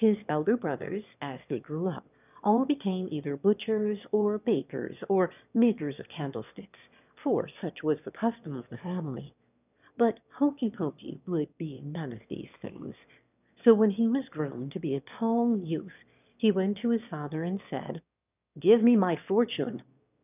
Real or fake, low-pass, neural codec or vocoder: fake; 3.6 kHz; autoencoder, 22.05 kHz, a latent of 192 numbers a frame, VITS, trained on one speaker